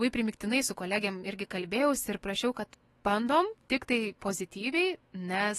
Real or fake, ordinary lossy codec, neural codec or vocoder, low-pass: fake; AAC, 32 kbps; autoencoder, 48 kHz, 128 numbers a frame, DAC-VAE, trained on Japanese speech; 19.8 kHz